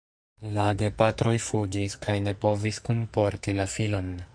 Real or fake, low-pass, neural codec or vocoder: fake; 9.9 kHz; codec, 44.1 kHz, 2.6 kbps, SNAC